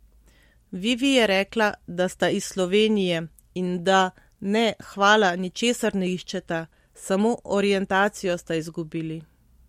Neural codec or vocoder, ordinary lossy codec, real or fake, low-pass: none; MP3, 64 kbps; real; 19.8 kHz